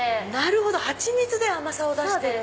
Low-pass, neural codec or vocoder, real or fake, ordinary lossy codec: none; none; real; none